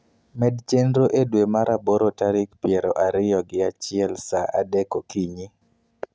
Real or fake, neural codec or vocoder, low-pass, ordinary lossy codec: real; none; none; none